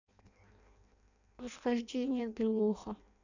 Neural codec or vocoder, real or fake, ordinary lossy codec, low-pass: codec, 16 kHz in and 24 kHz out, 0.6 kbps, FireRedTTS-2 codec; fake; none; 7.2 kHz